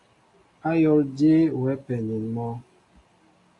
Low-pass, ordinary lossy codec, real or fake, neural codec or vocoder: 10.8 kHz; AAC, 48 kbps; real; none